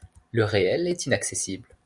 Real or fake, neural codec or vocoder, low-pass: real; none; 10.8 kHz